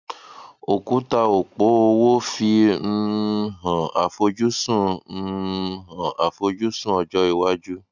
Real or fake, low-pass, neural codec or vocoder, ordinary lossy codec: real; 7.2 kHz; none; none